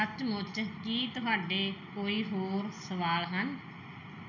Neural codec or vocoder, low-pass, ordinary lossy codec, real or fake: none; 7.2 kHz; none; real